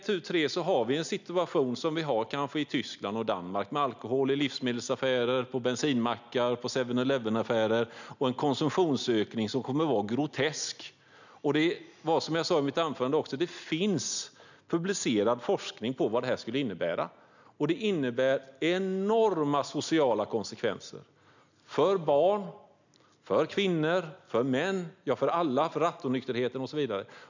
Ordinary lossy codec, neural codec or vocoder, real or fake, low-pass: none; none; real; 7.2 kHz